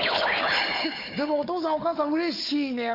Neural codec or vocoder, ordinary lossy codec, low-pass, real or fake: codec, 16 kHz, 4 kbps, FunCodec, trained on Chinese and English, 50 frames a second; Opus, 64 kbps; 5.4 kHz; fake